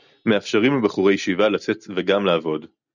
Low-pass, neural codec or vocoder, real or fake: 7.2 kHz; none; real